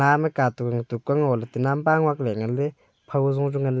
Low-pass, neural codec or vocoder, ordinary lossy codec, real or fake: none; none; none; real